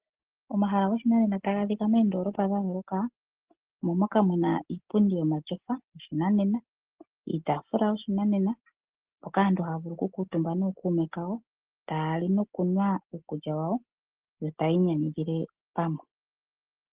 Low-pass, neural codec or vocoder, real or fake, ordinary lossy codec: 3.6 kHz; none; real; Opus, 16 kbps